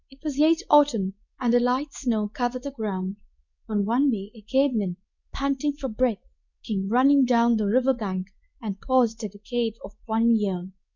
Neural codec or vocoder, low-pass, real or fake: codec, 24 kHz, 0.9 kbps, WavTokenizer, medium speech release version 2; 7.2 kHz; fake